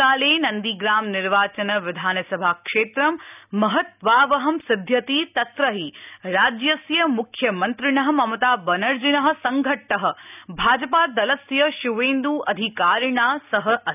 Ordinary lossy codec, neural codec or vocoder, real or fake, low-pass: none; none; real; 3.6 kHz